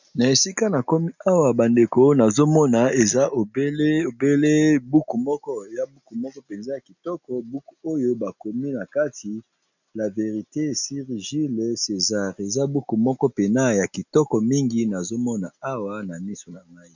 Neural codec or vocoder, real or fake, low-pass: none; real; 7.2 kHz